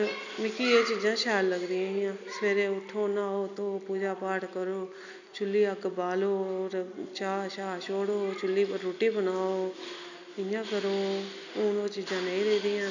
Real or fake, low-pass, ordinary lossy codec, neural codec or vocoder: real; 7.2 kHz; none; none